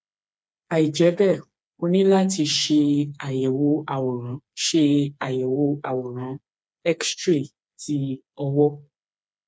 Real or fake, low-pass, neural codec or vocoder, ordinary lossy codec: fake; none; codec, 16 kHz, 4 kbps, FreqCodec, smaller model; none